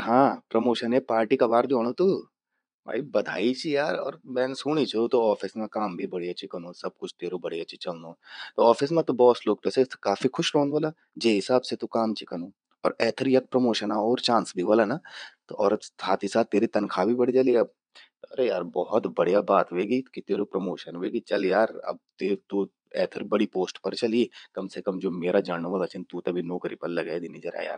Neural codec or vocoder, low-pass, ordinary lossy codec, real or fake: vocoder, 22.05 kHz, 80 mel bands, Vocos; 9.9 kHz; none; fake